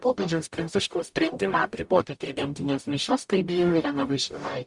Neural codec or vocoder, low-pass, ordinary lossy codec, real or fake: codec, 44.1 kHz, 0.9 kbps, DAC; 10.8 kHz; Opus, 64 kbps; fake